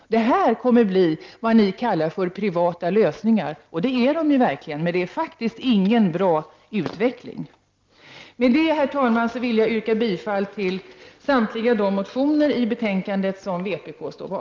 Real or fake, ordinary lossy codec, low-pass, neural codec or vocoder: real; Opus, 24 kbps; 7.2 kHz; none